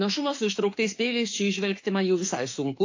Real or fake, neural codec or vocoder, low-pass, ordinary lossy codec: fake; autoencoder, 48 kHz, 32 numbers a frame, DAC-VAE, trained on Japanese speech; 7.2 kHz; AAC, 48 kbps